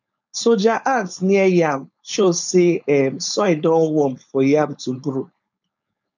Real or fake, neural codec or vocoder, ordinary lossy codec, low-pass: fake; codec, 16 kHz, 4.8 kbps, FACodec; none; 7.2 kHz